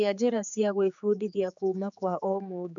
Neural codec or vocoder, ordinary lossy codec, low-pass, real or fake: codec, 16 kHz, 4 kbps, X-Codec, HuBERT features, trained on general audio; none; 7.2 kHz; fake